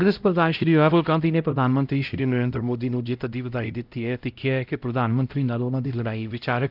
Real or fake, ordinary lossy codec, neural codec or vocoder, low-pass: fake; Opus, 24 kbps; codec, 16 kHz, 0.5 kbps, X-Codec, HuBERT features, trained on LibriSpeech; 5.4 kHz